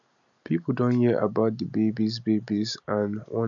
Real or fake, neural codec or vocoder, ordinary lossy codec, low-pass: real; none; none; 7.2 kHz